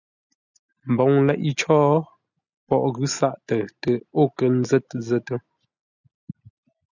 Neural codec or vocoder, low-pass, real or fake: none; 7.2 kHz; real